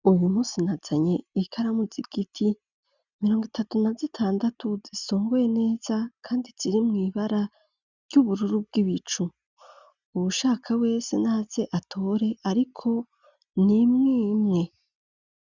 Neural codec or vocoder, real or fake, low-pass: none; real; 7.2 kHz